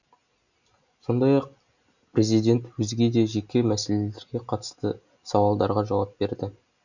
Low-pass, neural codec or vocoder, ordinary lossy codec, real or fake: 7.2 kHz; none; none; real